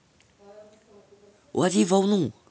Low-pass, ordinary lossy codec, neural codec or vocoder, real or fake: none; none; none; real